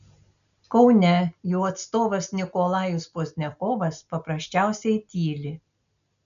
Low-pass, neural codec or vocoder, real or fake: 7.2 kHz; none; real